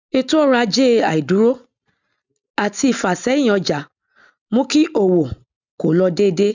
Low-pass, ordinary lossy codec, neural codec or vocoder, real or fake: 7.2 kHz; none; none; real